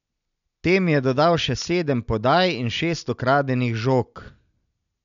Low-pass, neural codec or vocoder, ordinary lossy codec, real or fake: 7.2 kHz; none; none; real